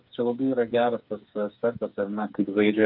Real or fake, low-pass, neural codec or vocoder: fake; 5.4 kHz; codec, 16 kHz, 4 kbps, FreqCodec, smaller model